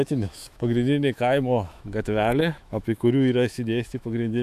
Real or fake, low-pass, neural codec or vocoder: fake; 14.4 kHz; autoencoder, 48 kHz, 128 numbers a frame, DAC-VAE, trained on Japanese speech